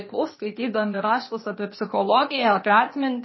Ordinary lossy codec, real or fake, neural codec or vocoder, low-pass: MP3, 24 kbps; fake; codec, 16 kHz, 0.8 kbps, ZipCodec; 7.2 kHz